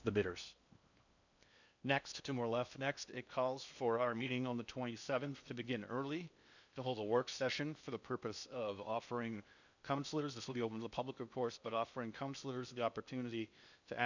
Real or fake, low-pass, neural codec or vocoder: fake; 7.2 kHz; codec, 16 kHz in and 24 kHz out, 0.8 kbps, FocalCodec, streaming, 65536 codes